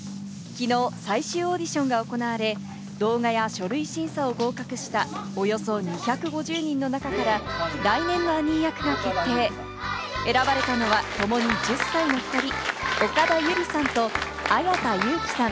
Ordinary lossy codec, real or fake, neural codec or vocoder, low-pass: none; real; none; none